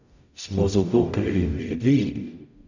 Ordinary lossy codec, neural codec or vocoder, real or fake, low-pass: none; codec, 44.1 kHz, 0.9 kbps, DAC; fake; 7.2 kHz